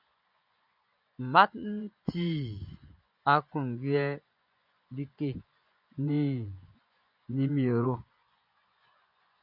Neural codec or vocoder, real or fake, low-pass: vocoder, 24 kHz, 100 mel bands, Vocos; fake; 5.4 kHz